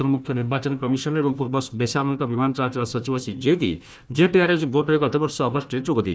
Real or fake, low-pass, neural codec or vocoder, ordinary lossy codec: fake; none; codec, 16 kHz, 1 kbps, FunCodec, trained on Chinese and English, 50 frames a second; none